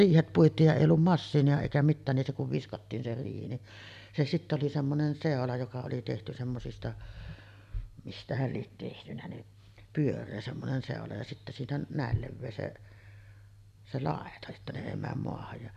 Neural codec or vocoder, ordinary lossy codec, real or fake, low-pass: none; none; real; 14.4 kHz